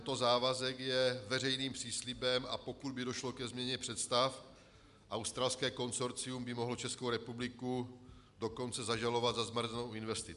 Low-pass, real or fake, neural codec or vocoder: 10.8 kHz; real; none